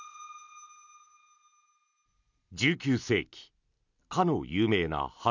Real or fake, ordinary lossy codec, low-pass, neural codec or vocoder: real; none; 7.2 kHz; none